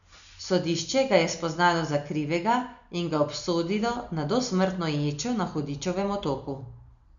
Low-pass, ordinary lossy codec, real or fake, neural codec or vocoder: 7.2 kHz; none; real; none